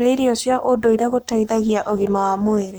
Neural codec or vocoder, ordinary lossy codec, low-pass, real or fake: codec, 44.1 kHz, 7.8 kbps, Pupu-Codec; none; none; fake